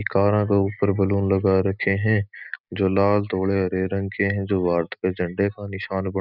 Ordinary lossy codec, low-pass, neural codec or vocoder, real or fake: none; 5.4 kHz; none; real